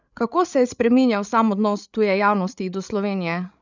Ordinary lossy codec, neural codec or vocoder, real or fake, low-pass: none; codec, 16 kHz, 16 kbps, FreqCodec, larger model; fake; 7.2 kHz